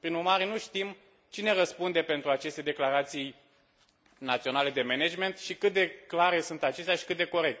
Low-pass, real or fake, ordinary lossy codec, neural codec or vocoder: none; real; none; none